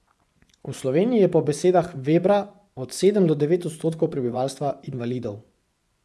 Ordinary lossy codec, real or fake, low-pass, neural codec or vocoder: none; fake; none; vocoder, 24 kHz, 100 mel bands, Vocos